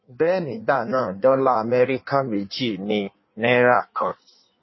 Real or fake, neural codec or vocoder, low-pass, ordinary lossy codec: fake; codec, 16 kHz in and 24 kHz out, 1.1 kbps, FireRedTTS-2 codec; 7.2 kHz; MP3, 24 kbps